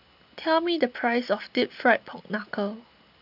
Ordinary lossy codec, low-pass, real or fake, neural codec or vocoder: AAC, 48 kbps; 5.4 kHz; real; none